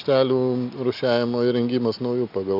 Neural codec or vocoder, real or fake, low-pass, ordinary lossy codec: none; real; 5.4 kHz; AAC, 48 kbps